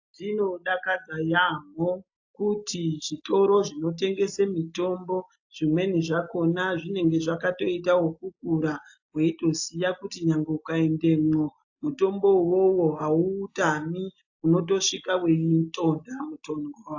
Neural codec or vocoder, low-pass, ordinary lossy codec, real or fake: none; 7.2 kHz; AAC, 48 kbps; real